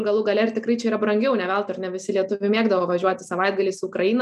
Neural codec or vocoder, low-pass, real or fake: none; 14.4 kHz; real